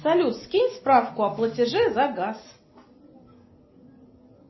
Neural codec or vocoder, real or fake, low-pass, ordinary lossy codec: none; real; 7.2 kHz; MP3, 24 kbps